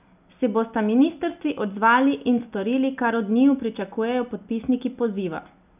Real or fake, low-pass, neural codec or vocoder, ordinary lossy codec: real; 3.6 kHz; none; none